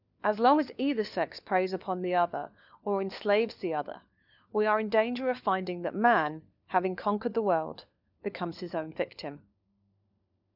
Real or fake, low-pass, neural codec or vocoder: fake; 5.4 kHz; codec, 16 kHz, 4 kbps, FunCodec, trained on LibriTTS, 50 frames a second